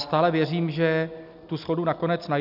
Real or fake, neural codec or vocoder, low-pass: real; none; 5.4 kHz